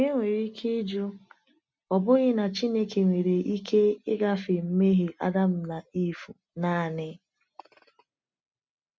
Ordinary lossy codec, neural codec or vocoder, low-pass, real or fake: none; none; none; real